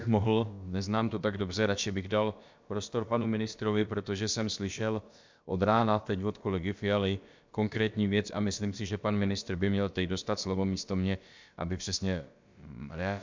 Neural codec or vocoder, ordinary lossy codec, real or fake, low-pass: codec, 16 kHz, about 1 kbps, DyCAST, with the encoder's durations; MP3, 64 kbps; fake; 7.2 kHz